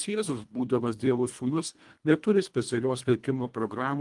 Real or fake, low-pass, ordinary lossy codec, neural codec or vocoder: fake; 10.8 kHz; Opus, 32 kbps; codec, 24 kHz, 1.5 kbps, HILCodec